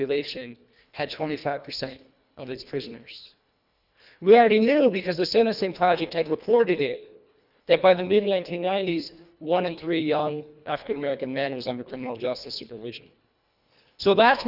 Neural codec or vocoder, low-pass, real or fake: codec, 24 kHz, 1.5 kbps, HILCodec; 5.4 kHz; fake